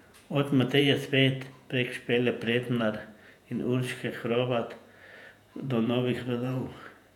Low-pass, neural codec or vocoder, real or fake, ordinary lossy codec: 19.8 kHz; vocoder, 48 kHz, 128 mel bands, Vocos; fake; none